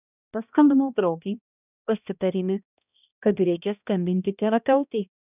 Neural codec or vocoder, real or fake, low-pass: codec, 16 kHz, 1 kbps, X-Codec, HuBERT features, trained on balanced general audio; fake; 3.6 kHz